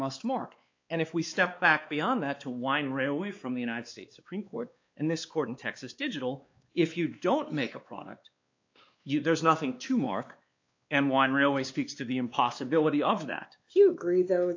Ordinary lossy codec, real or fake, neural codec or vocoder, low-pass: AAC, 48 kbps; fake; codec, 16 kHz, 2 kbps, X-Codec, WavLM features, trained on Multilingual LibriSpeech; 7.2 kHz